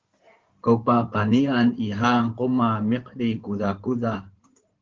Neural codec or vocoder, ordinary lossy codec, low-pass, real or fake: codec, 16 kHz in and 24 kHz out, 2.2 kbps, FireRedTTS-2 codec; Opus, 16 kbps; 7.2 kHz; fake